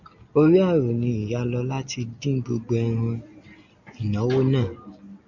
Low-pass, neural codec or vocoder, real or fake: 7.2 kHz; none; real